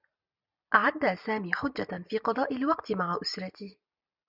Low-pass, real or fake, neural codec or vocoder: 5.4 kHz; real; none